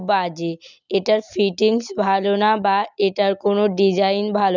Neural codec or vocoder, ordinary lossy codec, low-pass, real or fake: none; none; 7.2 kHz; real